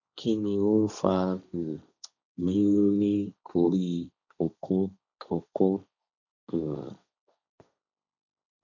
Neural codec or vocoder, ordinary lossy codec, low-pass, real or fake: codec, 16 kHz, 1.1 kbps, Voila-Tokenizer; none; 7.2 kHz; fake